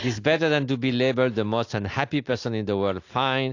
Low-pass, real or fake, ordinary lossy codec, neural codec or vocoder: 7.2 kHz; real; AAC, 48 kbps; none